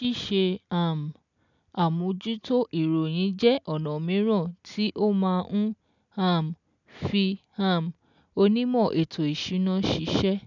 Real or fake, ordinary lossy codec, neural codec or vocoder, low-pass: real; none; none; 7.2 kHz